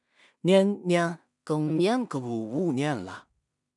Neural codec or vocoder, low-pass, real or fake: codec, 16 kHz in and 24 kHz out, 0.4 kbps, LongCat-Audio-Codec, two codebook decoder; 10.8 kHz; fake